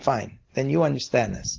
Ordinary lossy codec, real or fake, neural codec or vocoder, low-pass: Opus, 16 kbps; real; none; 7.2 kHz